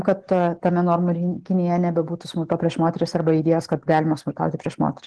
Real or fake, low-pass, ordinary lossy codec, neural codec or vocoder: real; 10.8 kHz; Opus, 16 kbps; none